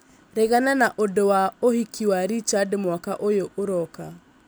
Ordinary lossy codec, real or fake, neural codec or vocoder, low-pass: none; real; none; none